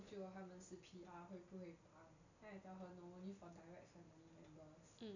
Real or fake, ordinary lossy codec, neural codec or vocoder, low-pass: real; none; none; 7.2 kHz